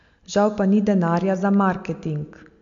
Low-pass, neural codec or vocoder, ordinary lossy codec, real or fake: 7.2 kHz; none; MP3, 48 kbps; real